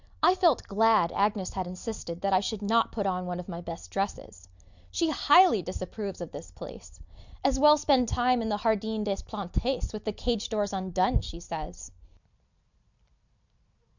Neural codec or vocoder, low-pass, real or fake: none; 7.2 kHz; real